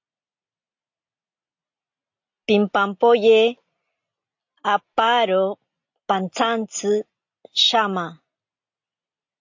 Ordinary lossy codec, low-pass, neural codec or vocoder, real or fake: AAC, 48 kbps; 7.2 kHz; none; real